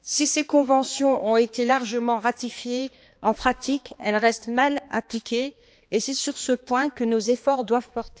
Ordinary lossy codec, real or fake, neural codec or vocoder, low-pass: none; fake; codec, 16 kHz, 2 kbps, X-Codec, HuBERT features, trained on balanced general audio; none